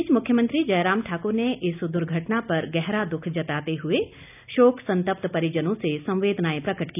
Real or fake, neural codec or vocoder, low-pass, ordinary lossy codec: real; none; 3.6 kHz; none